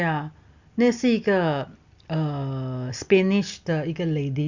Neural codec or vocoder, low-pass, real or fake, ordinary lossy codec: none; 7.2 kHz; real; Opus, 64 kbps